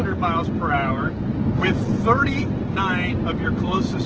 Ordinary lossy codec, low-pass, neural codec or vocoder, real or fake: Opus, 16 kbps; 7.2 kHz; none; real